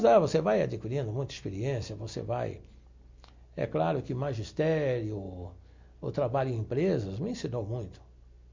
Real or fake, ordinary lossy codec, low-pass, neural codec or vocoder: real; MP3, 48 kbps; 7.2 kHz; none